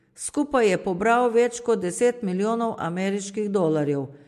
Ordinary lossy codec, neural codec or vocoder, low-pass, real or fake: MP3, 64 kbps; none; 14.4 kHz; real